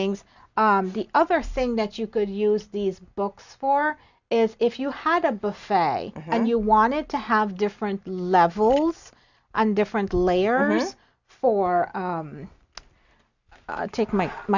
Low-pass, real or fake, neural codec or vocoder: 7.2 kHz; real; none